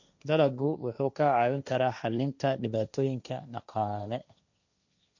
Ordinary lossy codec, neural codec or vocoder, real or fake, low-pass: none; codec, 16 kHz, 1.1 kbps, Voila-Tokenizer; fake; 7.2 kHz